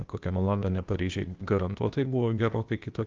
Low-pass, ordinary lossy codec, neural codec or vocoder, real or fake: 7.2 kHz; Opus, 24 kbps; codec, 16 kHz, 0.8 kbps, ZipCodec; fake